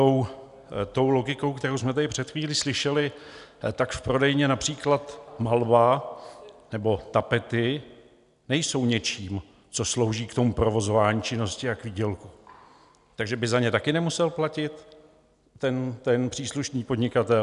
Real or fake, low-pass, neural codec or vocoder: real; 10.8 kHz; none